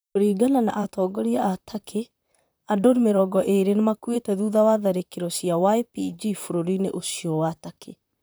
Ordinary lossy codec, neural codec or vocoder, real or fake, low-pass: none; vocoder, 44.1 kHz, 128 mel bands, Pupu-Vocoder; fake; none